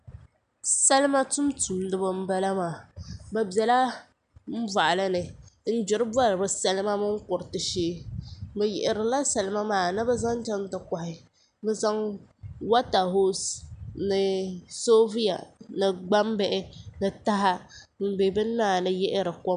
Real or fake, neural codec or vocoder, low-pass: real; none; 9.9 kHz